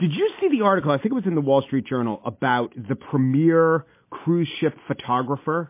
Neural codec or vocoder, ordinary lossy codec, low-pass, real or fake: none; MP3, 24 kbps; 3.6 kHz; real